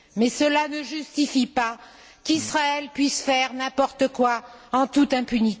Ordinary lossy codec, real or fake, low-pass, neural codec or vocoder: none; real; none; none